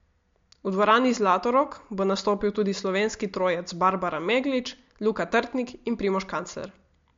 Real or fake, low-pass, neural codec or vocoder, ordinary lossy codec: real; 7.2 kHz; none; MP3, 48 kbps